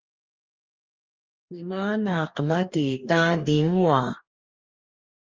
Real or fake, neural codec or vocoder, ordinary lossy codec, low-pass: fake; codec, 44.1 kHz, 2.6 kbps, DAC; Opus, 32 kbps; 7.2 kHz